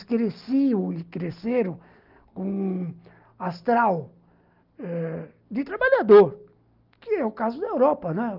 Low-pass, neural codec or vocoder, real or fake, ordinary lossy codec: 5.4 kHz; none; real; Opus, 32 kbps